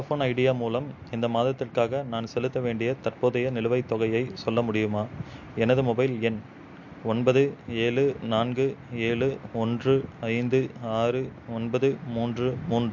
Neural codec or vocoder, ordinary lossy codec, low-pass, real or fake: none; MP3, 48 kbps; 7.2 kHz; real